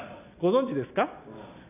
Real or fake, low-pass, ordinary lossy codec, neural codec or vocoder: real; 3.6 kHz; none; none